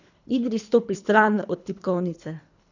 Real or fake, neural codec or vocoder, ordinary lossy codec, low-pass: fake; codec, 24 kHz, 3 kbps, HILCodec; none; 7.2 kHz